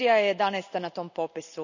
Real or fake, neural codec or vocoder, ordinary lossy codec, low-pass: real; none; none; 7.2 kHz